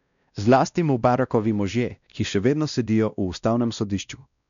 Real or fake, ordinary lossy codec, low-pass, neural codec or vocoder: fake; MP3, 96 kbps; 7.2 kHz; codec, 16 kHz, 1 kbps, X-Codec, WavLM features, trained on Multilingual LibriSpeech